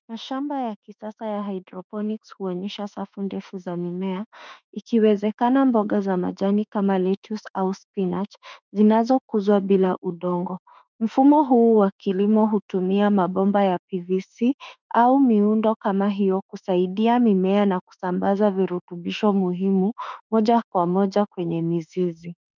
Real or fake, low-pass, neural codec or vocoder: fake; 7.2 kHz; autoencoder, 48 kHz, 32 numbers a frame, DAC-VAE, trained on Japanese speech